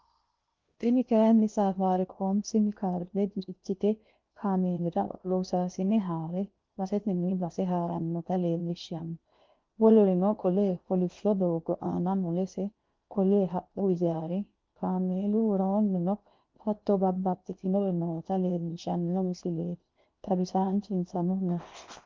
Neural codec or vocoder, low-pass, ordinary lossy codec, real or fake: codec, 16 kHz in and 24 kHz out, 0.8 kbps, FocalCodec, streaming, 65536 codes; 7.2 kHz; Opus, 24 kbps; fake